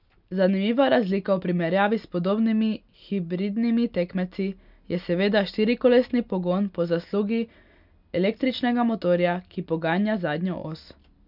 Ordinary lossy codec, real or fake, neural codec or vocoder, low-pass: none; real; none; 5.4 kHz